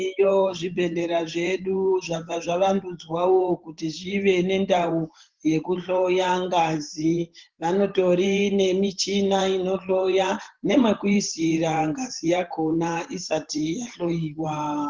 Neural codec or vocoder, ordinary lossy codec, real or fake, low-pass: vocoder, 44.1 kHz, 128 mel bands every 512 samples, BigVGAN v2; Opus, 16 kbps; fake; 7.2 kHz